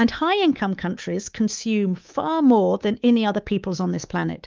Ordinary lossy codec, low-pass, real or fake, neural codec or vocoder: Opus, 32 kbps; 7.2 kHz; fake; codec, 24 kHz, 3.1 kbps, DualCodec